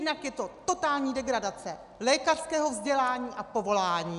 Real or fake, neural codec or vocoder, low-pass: real; none; 10.8 kHz